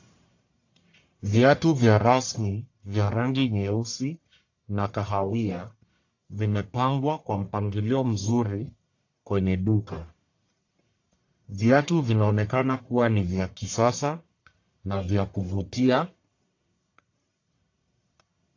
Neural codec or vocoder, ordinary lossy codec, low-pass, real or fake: codec, 44.1 kHz, 1.7 kbps, Pupu-Codec; AAC, 48 kbps; 7.2 kHz; fake